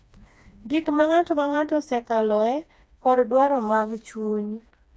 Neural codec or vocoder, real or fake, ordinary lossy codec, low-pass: codec, 16 kHz, 2 kbps, FreqCodec, smaller model; fake; none; none